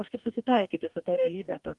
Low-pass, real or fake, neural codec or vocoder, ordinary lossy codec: 10.8 kHz; fake; codec, 44.1 kHz, 2.6 kbps, DAC; Opus, 24 kbps